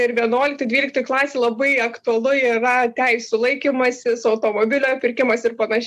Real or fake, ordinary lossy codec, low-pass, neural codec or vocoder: real; MP3, 96 kbps; 14.4 kHz; none